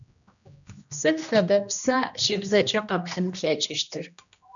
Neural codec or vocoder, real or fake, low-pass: codec, 16 kHz, 1 kbps, X-Codec, HuBERT features, trained on general audio; fake; 7.2 kHz